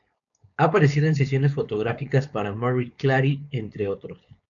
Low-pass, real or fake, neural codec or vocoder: 7.2 kHz; fake; codec, 16 kHz, 4.8 kbps, FACodec